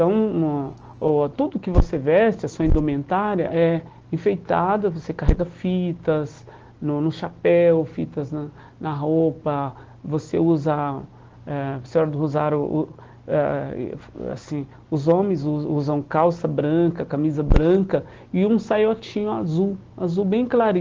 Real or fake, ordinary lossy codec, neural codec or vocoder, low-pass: real; Opus, 16 kbps; none; 7.2 kHz